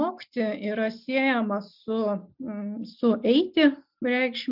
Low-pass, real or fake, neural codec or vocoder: 5.4 kHz; real; none